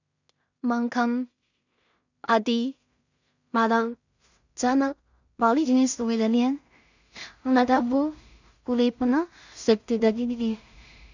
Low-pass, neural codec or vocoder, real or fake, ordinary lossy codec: 7.2 kHz; codec, 16 kHz in and 24 kHz out, 0.4 kbps, LongCat-Audio-Codec, two codebook decoder; fake; none